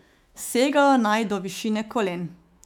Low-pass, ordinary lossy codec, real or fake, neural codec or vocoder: 19.8 kHz; none; fake; autoencoder, 48 kHz, 128 numbers a frame, DAC-VAE, trained on Japanese speech